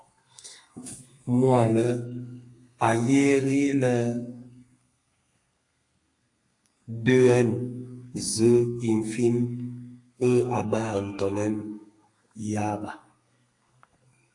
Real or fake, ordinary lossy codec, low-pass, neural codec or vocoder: fake; AAC, 48 kbps; 10.8 kHz; codec, 32 kHz, 1.9 kbps, SNAC